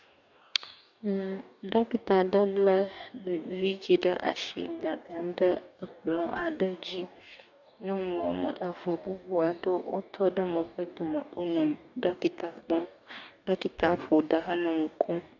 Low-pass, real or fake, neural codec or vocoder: 7.2 kHz; fake; codec, 44.1 kHz, 2.6 kbps, DAC